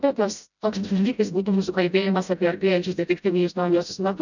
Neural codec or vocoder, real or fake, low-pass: codec, 16 kHz, 0.5 kbps, FreqCodec, smaller model; fake; 7.2 kHz